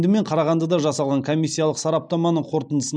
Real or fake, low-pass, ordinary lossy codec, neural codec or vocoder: real; 9.9 kHz; none; none